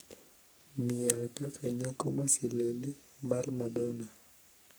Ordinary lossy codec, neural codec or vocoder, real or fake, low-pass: none; codec, 44.1 kHz, 3.4 kbps, Pupu-Codec; fake; none